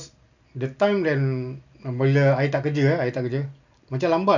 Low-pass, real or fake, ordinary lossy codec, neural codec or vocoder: 7.2 kHz; real; none; none